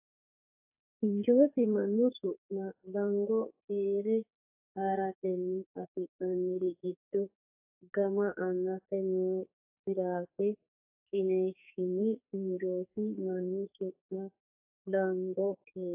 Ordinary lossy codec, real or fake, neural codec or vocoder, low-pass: AAC, 32 kbps; fake; codec, 44.1 kHz, 2.6 kbps, SNAC; 3.6 kHz